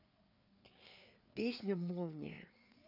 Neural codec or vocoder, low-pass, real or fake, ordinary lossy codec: codec, 16 kHz, 4 kbps, FreqCodec, larger model; 5.4 kHz; fake; none